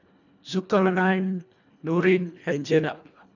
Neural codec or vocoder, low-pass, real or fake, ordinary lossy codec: codec, 24 kHz, 1.5 kbps, HILCodec; 7.2 kHz; fake; none